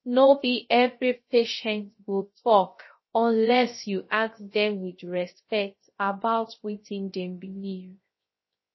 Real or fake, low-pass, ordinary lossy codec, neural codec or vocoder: fake; 7.2 kHz; MP3, 24 kbps; codec, 16 kHz, 0.3 kbps, FocalCodec